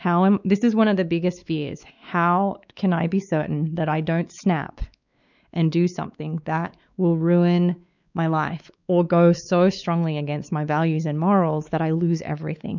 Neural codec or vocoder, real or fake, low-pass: codec, 16 kHz, 4 kbps, X-Codec, WavLM features, trained on Multilingual LibriSpeech; fake; 7.2 kHz